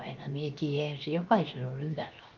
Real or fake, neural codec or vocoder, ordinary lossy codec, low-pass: fake; codec, 16 kHz, 0.7 kbps, FocalCodec; Opus, 24 kbps; 7.2 kHz